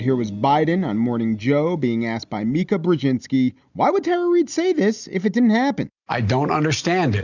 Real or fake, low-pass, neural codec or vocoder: real; 7.2 kHz; none